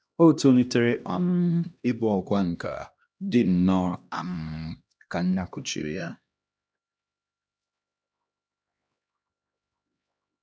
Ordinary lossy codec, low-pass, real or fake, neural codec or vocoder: none; none; fake; codec, 16 kHz, 1 kbps, X-Codec, HuBERT features, trained on LibriSpeech